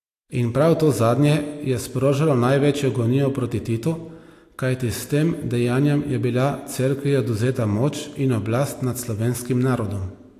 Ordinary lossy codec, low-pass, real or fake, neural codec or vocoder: AAC, 64 kbps; 14.4 kHz; real; none